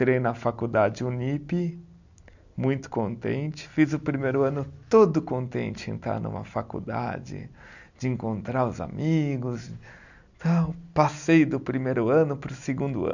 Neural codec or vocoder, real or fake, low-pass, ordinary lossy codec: none; real; 7.2 kHz; none